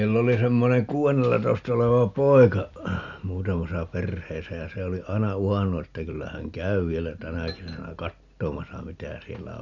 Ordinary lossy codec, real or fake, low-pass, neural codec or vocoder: none; real; 7.2 kHz; none